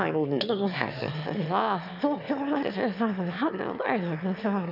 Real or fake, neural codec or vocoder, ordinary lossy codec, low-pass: fake; autoencoder, 22.05 kHz, a latent of 192 numbers a frame, VITS, trained on one speaker; none; 5.4 kHz